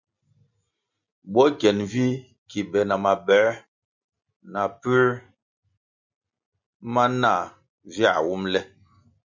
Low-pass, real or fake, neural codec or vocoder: 7.2 kHz; real; none